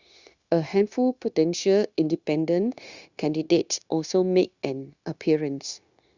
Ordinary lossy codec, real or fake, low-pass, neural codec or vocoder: Opus, 64 kbps; fake; 7.2 kHz; codec, 16 kHz, 0.9 kbps, LongCat-Audio-Codec